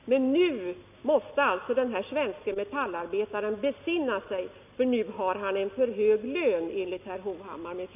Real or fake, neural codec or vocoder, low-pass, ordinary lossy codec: real; none; 3.6 kHz; none